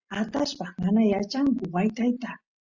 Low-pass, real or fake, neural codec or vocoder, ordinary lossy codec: 7.2 kHz; real; none; Opus, 64 kbps